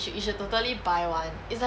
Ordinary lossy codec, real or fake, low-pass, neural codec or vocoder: none; real; none; none